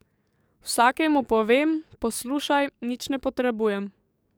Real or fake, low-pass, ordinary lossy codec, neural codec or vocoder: fake; none; none; codec, 44.1 kHz, 7.8 kbps, DAC